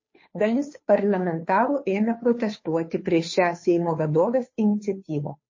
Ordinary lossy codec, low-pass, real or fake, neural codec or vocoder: MP3, 32 kbps; 7.2 kHz; fake; codec, 16 kHz, 2 kbps, FunCodec, trained on Chinese and English, 25 frames a second